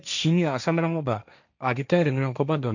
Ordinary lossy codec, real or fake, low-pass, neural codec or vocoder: none; fake; 7.2 kHz; codec, 16 kHz, 1.1 kbps, Voila-Tokenizer